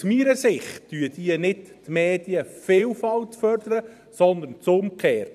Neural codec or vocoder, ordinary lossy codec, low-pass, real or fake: vocoder, 44.1 kHz, 128 mel bands every 512 samples, BigVGAN v2; none; 14.4 kHz; fake